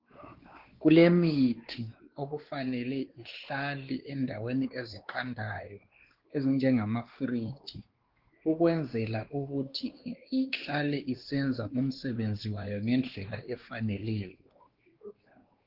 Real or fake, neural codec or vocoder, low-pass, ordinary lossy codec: fake; codec, 16 kHz, 2 kbps, X-Codec, WavLM features, trained on Multilingual LibriSpeech; 5.4 kHz; Opus, 16 kbps